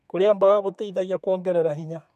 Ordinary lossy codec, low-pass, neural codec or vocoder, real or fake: none; 14.4 kHz; codec, 32 kHz, 1.9 kbps, SNAC; fake